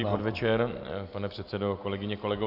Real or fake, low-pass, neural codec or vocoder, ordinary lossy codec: real; 5.4 kHz; none; AAC, 48 kbps